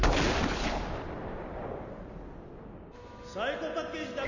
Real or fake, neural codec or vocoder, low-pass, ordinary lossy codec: real; none; 7.2 kHz; none